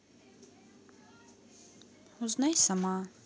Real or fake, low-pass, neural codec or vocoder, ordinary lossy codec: real; none; none; none